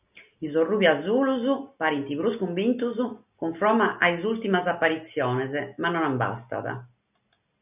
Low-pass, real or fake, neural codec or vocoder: 3.6 kHz; real; none